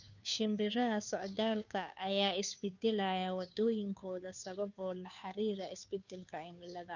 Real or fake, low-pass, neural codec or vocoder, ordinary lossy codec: fake; 7.2 kHz; codec, 16 kHz, 2 kbps, FunCodec, trained on Chinese and English, 25 frames a second; none